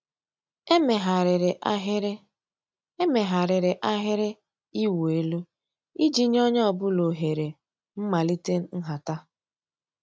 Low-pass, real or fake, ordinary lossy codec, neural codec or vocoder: none; real; none; none